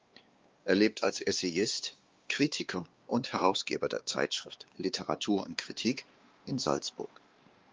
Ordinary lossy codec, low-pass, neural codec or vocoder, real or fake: Opus, 24 kbps; 7.2 kHz; codec, 16 kHz, 2 kbps, X-Codec, WavLM features, trained on Multilingual LibriSpeech; fake